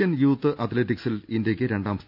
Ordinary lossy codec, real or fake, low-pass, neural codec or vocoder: none; real; 5.4 kHz; none